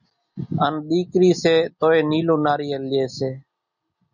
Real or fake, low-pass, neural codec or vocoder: real; 7.2 kHz; none